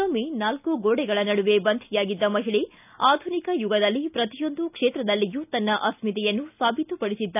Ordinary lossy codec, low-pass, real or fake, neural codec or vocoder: none; 3.6 kHz; real; none